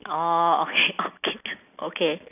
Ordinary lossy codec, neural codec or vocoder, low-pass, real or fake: none; none; 3.6 kHz; real